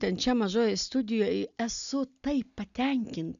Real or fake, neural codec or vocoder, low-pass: real; none; 7.2 kHz